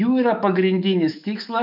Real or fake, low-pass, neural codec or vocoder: fake; 5.4 kHz; autoencoder, 48 kHz, 128 numbers a frame, DAC-VAE, trained on Japanese speech